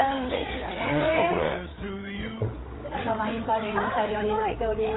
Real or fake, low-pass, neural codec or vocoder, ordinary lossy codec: fake; 7.2 kHz; codec, 16 kHz, 8 kbps, FreqCodec, larger model; AAC, 16 kbps